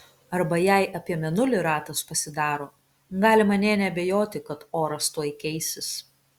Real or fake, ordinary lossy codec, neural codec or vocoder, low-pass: real; Opus, 64 kbps; none; 19.8 kHz